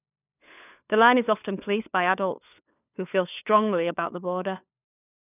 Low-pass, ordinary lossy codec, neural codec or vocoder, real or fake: 3.6 kHz; none; codec, 16 kHz, 4 kbps, FunCodec, trained on LibriTTS, 50 frames a second; fake